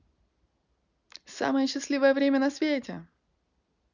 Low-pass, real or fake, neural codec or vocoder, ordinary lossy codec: 7.2 kHz; real; none; none